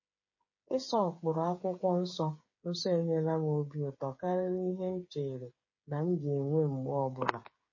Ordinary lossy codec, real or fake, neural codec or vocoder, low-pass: MP3, 32 kbps; fake; codec, 16 kHz, 8 kbps, FreqCodec, smaller model; 7.2 kHz